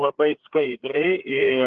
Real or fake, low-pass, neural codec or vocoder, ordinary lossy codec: fake; 7.2 kHz; codec, 16 kHz, 4 kbps, FreqCodec, larger model; Opus, 16 kbps